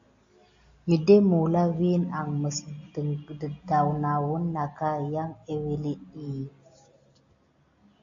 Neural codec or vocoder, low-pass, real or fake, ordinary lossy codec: none; 7.2 kHz; real; MP3, 64 kbps